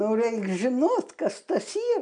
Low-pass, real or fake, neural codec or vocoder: 9.9 kHz; real; none